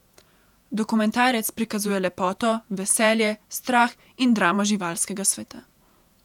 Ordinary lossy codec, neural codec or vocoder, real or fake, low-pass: none; vocoder, 48 kHz, 128 mel bands, Vocos; fake; 19.8 kHz